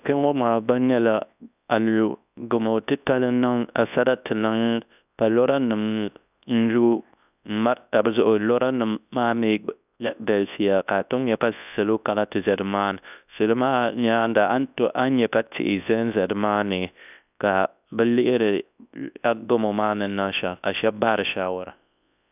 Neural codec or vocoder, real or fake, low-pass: codec, 24 kHz, 0.9 kbps, WavTokenizer, large speech release; fake; 3.6 kHz